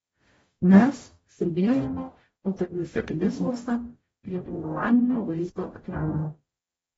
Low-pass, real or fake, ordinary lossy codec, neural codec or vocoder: 19.8 kHz; fake; AAC, 24 kbps; codec, 44.1 kHz, 0.9 kbps, DAC